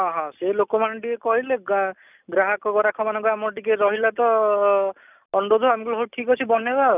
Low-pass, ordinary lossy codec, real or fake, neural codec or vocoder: 3.6 kHz; none; real; none